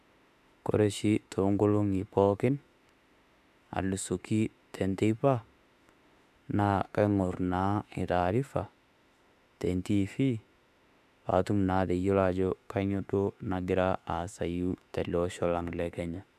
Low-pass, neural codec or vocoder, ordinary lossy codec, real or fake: 14.4 kHz; autoencoder, 48 kHz, 32 numbers a frame, DAC-VAE, trained on Japanese speech; none; fake